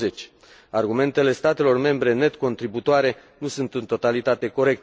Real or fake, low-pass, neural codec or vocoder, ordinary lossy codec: real; none; none; none